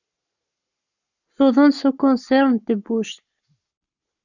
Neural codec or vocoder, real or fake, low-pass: codec, 44.1 kHz, 7.8 kbps, Pupu-Codec; fake; 7.2 kHz